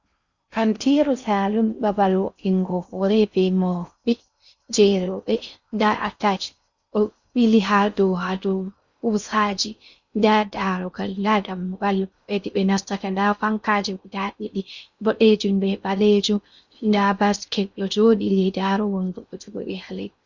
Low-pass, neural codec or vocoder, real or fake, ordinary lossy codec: 7.2 kHz; codec, 16 kHz in and 24 kHz out, 0.6 kbps, FocalCodec, streaming, 4096 codes; fake; Opus, 64 kbps